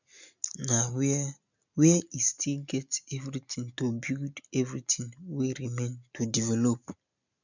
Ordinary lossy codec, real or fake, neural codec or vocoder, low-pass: none; real; none; 7.2 kHz